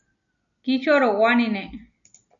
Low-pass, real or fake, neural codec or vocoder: 7.2 kHz; real; none